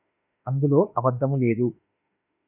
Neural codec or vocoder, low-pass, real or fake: autoencoder, 48 kHz, 32 numbers a frame, DAC-VAE, trained on Japanese speech; 3.6 kHz; fake